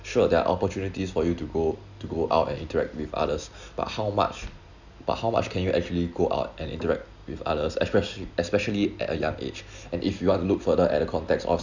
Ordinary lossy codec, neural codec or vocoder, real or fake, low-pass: none; none; real; 7.2 kHz